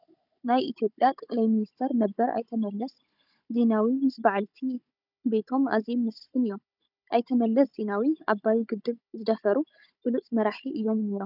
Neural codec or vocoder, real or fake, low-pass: codec, 16 kHz, 16 kbps, FunCodec, trained on Chinese and English, 50 frames a second; fake; 5.4 kHz